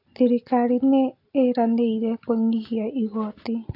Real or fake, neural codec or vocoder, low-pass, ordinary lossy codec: real; none; 5.4 kHz; AAC, 32 kbps